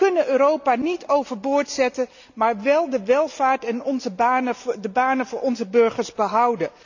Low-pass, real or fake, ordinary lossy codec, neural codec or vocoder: 7.2 kHz; real; none; none